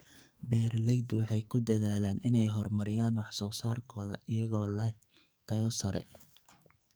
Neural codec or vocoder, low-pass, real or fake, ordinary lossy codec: codec, 44.1 kHz, 2.6 kbps, SNAC; none; fake; none